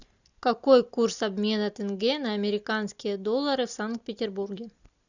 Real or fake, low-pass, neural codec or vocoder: real; 7.2 kHz; none